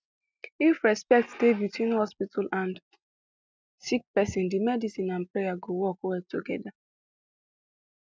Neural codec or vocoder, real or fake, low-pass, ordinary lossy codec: none; real; none; none